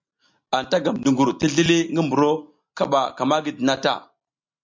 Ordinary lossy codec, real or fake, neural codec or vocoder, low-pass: MP3, 64 kbps; real; none; 7.2 kHz